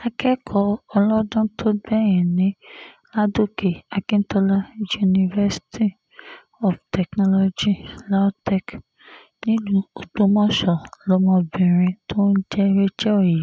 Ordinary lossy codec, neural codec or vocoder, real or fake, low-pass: none; none; real; none